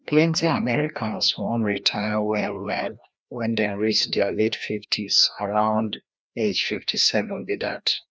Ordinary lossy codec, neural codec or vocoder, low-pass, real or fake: none; codec, 16 kHz, 1 kbps, FreqCodec, larger model; none; fake